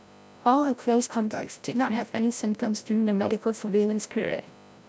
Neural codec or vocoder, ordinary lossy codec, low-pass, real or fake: codec, 16 kHz, 0.5 kbps, FreqCodec, larger model; none; none; fake